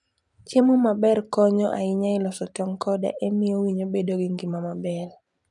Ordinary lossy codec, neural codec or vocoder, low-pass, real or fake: none; vocoder, 44.1 kHz, 128 mel bands every 256 samples, BigVGAN v2; 10.8 kHz; fake